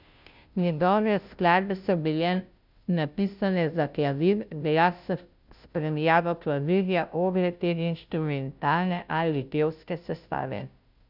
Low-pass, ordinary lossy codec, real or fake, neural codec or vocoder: 5.4 kHz; none; fake; codec, 16 kHz, 0.5 kbps, FunCodec, trained on Chinese and English, 25 frames a second